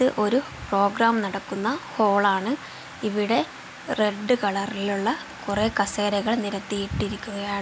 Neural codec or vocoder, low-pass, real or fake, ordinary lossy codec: none; none; real; none